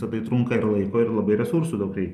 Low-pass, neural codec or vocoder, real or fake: 14.4 kHz; none; real